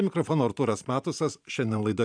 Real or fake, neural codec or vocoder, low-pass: fake; vocoder, 44.1 kHz, 128 mel bands every 512 samples, BigVGAN v2; 9.9 kHz